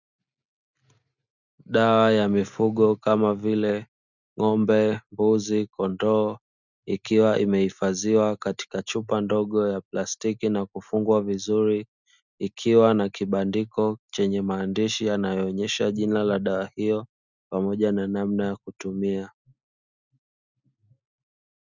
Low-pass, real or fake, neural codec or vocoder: 7.2 kHz; real; none